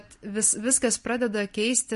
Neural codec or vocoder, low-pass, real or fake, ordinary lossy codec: none; 14.4 kHz; real; MP3, 48 kbps